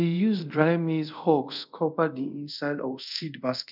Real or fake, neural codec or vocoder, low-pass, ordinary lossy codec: fake; codec, 24 kHz, 0.5 kbps, DualCodec; 5.4 kHz; none